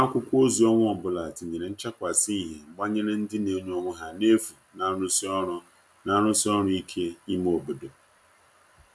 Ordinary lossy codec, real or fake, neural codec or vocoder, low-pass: none; real; none; none